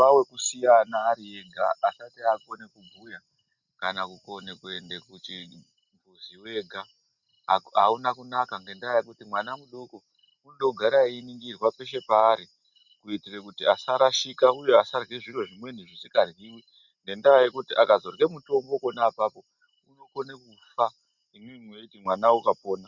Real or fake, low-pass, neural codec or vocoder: real; 7.2 kHz; none